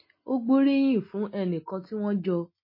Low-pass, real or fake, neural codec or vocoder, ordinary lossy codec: 5.4 kHz; real; none; MP3, 24 kbps